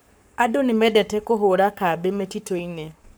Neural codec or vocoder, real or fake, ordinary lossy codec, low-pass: codec, 44.1 kHz, 7.8 kbps, Pupu-Codec; fake; none; none